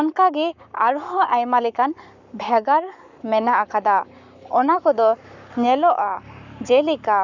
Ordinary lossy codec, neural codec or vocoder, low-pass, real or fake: none; codec, 44.1 kHz, 7.8 kbps, Pupu-Codec; 7.2 kHz; fake